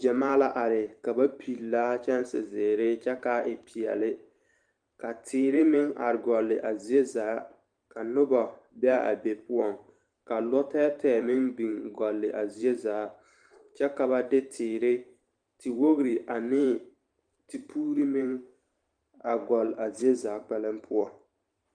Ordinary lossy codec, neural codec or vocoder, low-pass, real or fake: Opus, 32 kbps; vocoder, 44.1 kHz, 128 mel bands every 512 samples, BigVGAN v2; 9.9 kHz; fake